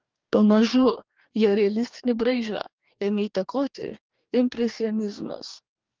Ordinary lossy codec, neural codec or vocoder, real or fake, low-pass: Opus, 24 kbps; codec, 44.1 kHz, 2.6 kbps, DAC; fake; 7.2 kHz